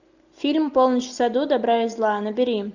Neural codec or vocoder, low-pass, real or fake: none; 7.2 kHz; real